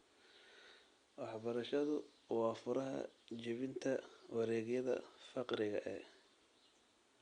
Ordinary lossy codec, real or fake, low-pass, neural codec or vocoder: Opus, 64 kbps; real; 9.9 kHz; none